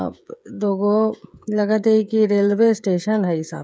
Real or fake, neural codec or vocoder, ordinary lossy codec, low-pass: fake; codec, 16 kHz, 16 kbps, FreqCodec, smaller model; none; none